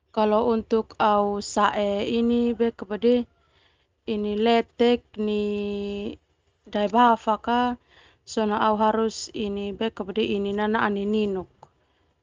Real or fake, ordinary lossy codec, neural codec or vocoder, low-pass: real; Opus, 32 kbps; none; 7.2 kHz